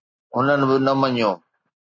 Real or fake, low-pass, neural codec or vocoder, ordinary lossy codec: real; 7.2 kHz; none; MP3, 32 kbps